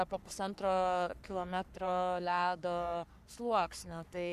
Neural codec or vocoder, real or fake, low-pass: codec, 44.1 kHz, 3.4 kbps, Pupu-Codec; fake; 14.4 kHz